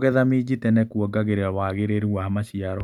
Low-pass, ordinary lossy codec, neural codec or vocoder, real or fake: 19.8 kHz; none; none; real